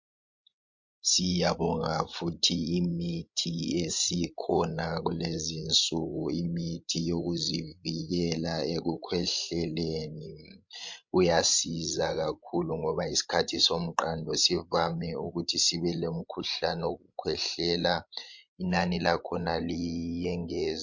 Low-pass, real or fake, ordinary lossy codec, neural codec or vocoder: 7.2 kHz; fake; MP3, 48 kbps; codec, 16 kHz, 16 kbps, FreqCodec, larger model